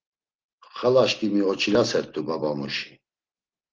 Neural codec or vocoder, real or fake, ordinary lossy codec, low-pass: none; real; Opus, 16 kbps; 7.2 kHz